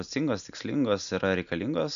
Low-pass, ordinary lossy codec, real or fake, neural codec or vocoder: 7.2 kHz; MP3, 96 kbps; real; none